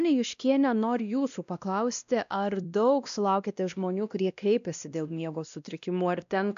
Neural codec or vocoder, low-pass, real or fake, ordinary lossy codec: codec, 16 kHz, 1 kbps, X-Codec, WavLM features, trained on Multilingual LibriSpeech; 7.2 kHz; fake; AAC, 96 kbps